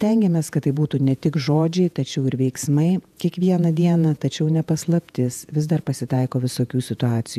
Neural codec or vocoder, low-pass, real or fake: vocoder, 48 kHz, 128 mel bands, Vocos; 14.4 kHz; fake